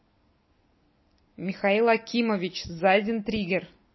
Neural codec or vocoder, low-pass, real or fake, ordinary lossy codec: none; 7.2 kHz; real; MP3, 24 kbps